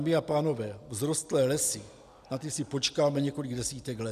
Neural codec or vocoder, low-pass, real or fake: none; 14.4 kHz; real